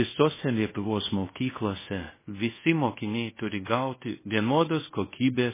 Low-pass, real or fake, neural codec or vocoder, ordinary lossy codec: 3.6 kHz; fake; codec, 24 kHz, 0.5 kbps, DualCodec; MP3, 16 kbps